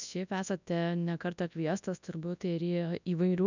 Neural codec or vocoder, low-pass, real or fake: codec, 24 kHz, 0.9 kbps, WavTokenizer, large speech release; 7.2 kHz; fake